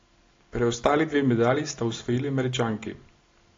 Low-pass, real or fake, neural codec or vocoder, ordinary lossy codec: 7.2 kHz; real; none; AAC, 32 kbps